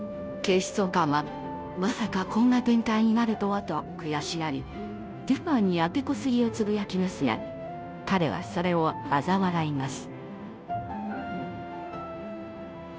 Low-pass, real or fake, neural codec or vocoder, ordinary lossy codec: none; fake; codec, 16 kHz, 0.5 kbps, FunCodec, trained on Chinese and English, 25 frames a second; none